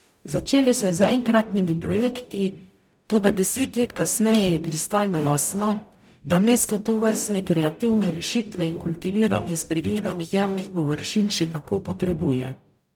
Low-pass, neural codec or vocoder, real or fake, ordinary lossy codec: 19.8 kHz; codec, 44.1 kHz, 0.9 kbps, DAC; fake; none